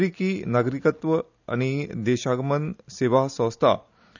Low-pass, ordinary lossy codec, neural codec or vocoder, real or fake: 7.2 kHz; none; none; real